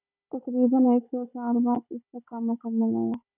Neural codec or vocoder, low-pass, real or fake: codec, 16 kHz, 16 kbps, FunCodec, trained on Chinese and English, 50 frames a second; 3.6 kHz; fake